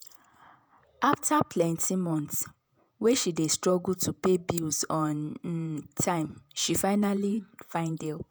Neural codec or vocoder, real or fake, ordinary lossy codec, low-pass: vocoder, 48 kHz, 128 mel bands, Vocos; fake; none; none